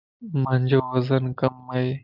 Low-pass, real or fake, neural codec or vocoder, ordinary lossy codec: 5.4 kHz; real; none; Opus, 24 kbps